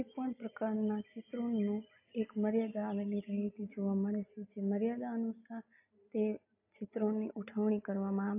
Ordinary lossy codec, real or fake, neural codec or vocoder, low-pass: AAC, 32 kbps; real; none; 3.6 kHz